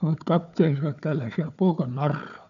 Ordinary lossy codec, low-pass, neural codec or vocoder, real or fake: none; 7.2 kHz; codec, 16 kHz, 4 kbps, FunCodec, trained on Chinese and English, 50 frames a second; fake